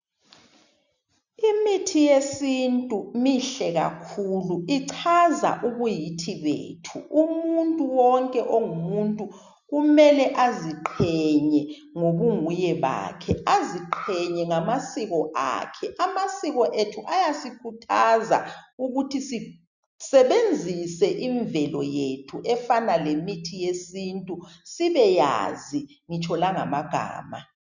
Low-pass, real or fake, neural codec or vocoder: 7.2 kHz; real; none